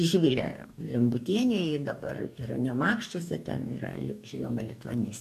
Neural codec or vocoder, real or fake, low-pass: codec, 44.1 kHz, 2.6 kbps, DAC; fake; 14.4 kHz